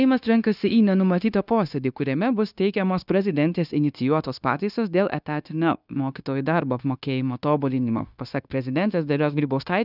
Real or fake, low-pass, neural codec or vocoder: fake; 5.4 kHz; codec, 16 kHz, 0.9 kbps, LongCat-Audio-Codec